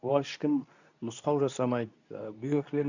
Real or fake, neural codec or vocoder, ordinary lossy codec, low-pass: fake; codec, 24 kHz, 0.9 kbps, WavTokenizer, medium speech release version 2; none; 7.2 kHz